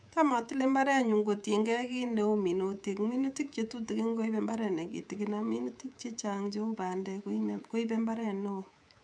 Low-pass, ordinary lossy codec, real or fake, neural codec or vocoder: 9.9 kHz; none; fake; codec, 24 kHz, 3.1 kbps, DualCodec